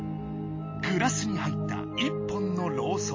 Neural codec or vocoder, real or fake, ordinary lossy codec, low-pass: none; real; MP3, 32 kbps; 7.2 kHz